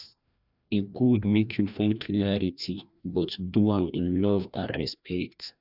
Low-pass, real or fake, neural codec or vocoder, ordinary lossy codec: 5.4 kHz; fake; codec, 16 kHz, 1 kbps, FreqCodec, larger model; none